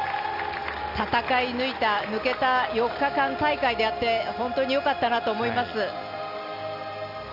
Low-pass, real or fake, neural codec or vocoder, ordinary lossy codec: 5.4 kHz; real; none; none